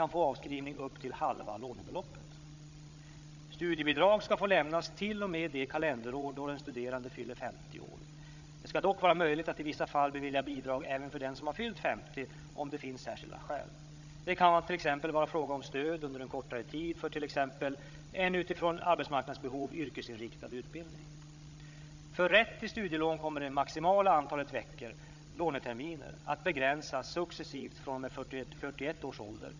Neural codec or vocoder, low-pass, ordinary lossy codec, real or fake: codec, 16 kHz, 16 kbps, FreqCodec, larger model; 7.2 kHz; none; fake